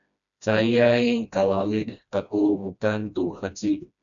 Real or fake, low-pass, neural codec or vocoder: fake; 7.2 kHz; codec, 16 kHz, 1 kbps, FreqCodec, smaller model